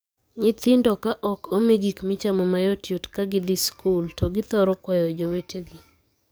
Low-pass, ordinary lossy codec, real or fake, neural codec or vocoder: none; none; fake; codec, 44.1 kHz, 7.8 kbps, DAC